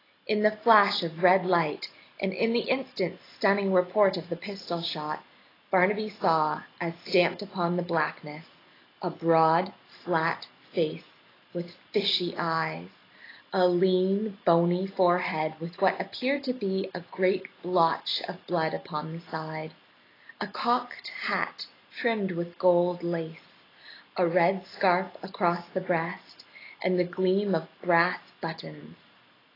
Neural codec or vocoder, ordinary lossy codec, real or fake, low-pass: none; AAC, 24 kbps; real; 5.4 kHz